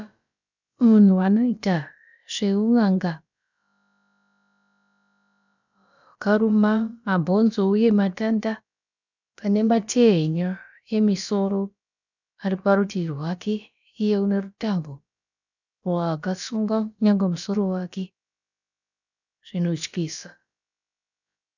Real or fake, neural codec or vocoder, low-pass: fake; codec, 16 kHz, about 1 kbps, DyCAST, with the encoder's durations; 7.2 kHz